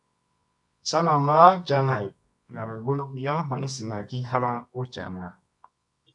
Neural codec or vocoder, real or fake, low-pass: codec, 24 kHz, 0.9 kbps, WavTokenizer, medium music audio release; fake; 10.8 kHz